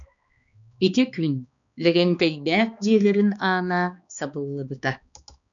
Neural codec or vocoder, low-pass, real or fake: codec, 16 kHz, 2 kbps, X-Codec, HuBERT features, trained on balanced general audio; 7.2 kHz; fake